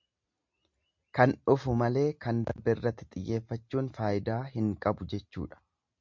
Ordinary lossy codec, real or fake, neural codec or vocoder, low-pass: MP3, 64 kbps; real; none; 7.2 kHz